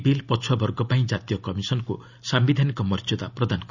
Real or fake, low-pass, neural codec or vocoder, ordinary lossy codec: real; 7.2 kHz; none; none